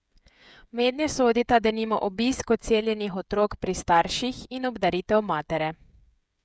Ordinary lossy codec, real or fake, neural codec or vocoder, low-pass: none; fake; codec, 16 kHz, 16 kbps, FreqCodec, smaller model; none